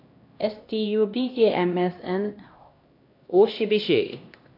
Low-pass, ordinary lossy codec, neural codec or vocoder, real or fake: 5.4 kHz; AAC, 32 kbps; codec, 16 kHz, 1 kbps, X-Codec, HuBERT features, trained on LibriSpeech; fake